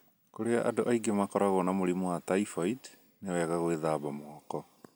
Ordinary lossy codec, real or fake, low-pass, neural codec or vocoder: none; real; none; none